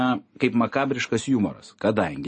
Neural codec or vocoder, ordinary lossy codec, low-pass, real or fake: none; MP3, 32 kbps; 10.8 kHz; real